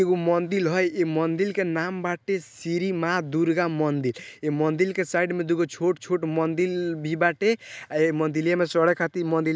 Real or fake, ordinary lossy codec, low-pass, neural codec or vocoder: real; none; none; none